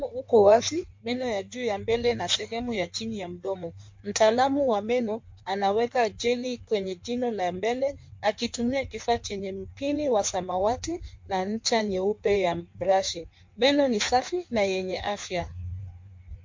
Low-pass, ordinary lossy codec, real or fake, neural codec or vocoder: 7.2 kHz; MP3, 48 kbps; fake; codec, 16 kHz in and 24 kHz out, 1.1 kbps, FireRedTTS-2 codec